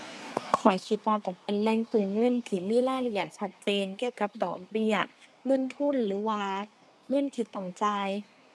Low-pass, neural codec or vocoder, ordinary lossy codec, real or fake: none; codec, 24 kHz, 1 kbps, SNAC; none; fake